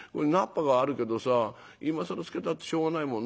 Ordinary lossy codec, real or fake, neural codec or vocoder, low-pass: none; real; none; none